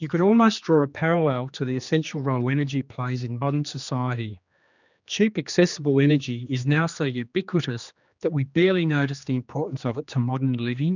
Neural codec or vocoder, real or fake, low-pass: codec, 16 kHz, 2 kbps, X-Codec, HuBERT features, trained on general audio; fake; 7.2 kHz